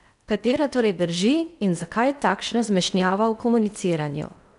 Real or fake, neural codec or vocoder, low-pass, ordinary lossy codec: fake; codec, 16 kHz in and 24 kHz out, 0.8 kbps, FocalCodec, streaming, 65536 codes; 10.8 kHz; none